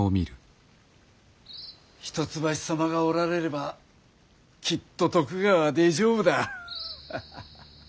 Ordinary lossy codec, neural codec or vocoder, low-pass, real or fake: none; none; none; real